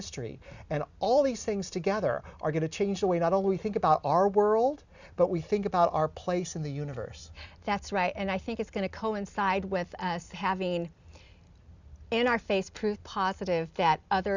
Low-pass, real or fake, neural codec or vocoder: 7.2 kHz; real; none